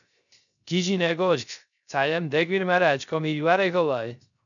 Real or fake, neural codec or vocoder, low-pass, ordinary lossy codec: fake; codec, 16 kHz, 0.3 kbps, FocalCodec; 7.2 kHz; MP3, 96 kbps